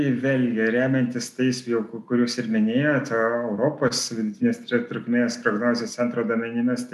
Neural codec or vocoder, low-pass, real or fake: none; 14.4 kHz; real